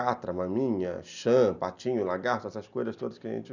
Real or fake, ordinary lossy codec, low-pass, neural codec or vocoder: real; none; 7.2 kHz; none